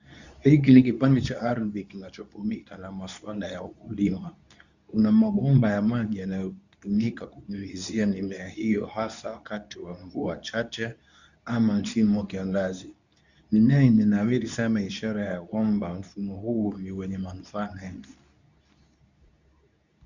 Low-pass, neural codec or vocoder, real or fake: 7.2 kHz; codec, 24 kHz, 0.9 kbps, WavTokenizer, medium speech release version 2; fake